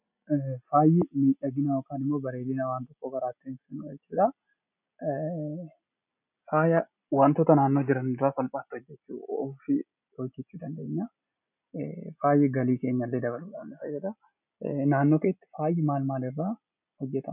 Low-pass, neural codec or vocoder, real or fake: 3.6 kHz; none; real